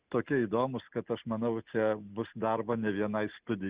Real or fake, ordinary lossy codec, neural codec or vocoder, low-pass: real; Opus, 64 kbps; none; 3.6 kHz